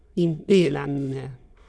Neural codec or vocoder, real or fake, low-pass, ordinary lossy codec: autoencoder, 22.05 kHz, a latent of 192 numbers a frame, VITS, trained on many speakers; fake; none; none